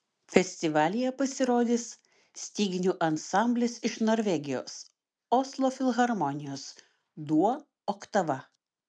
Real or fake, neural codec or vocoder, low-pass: real; none; 9.9 kHz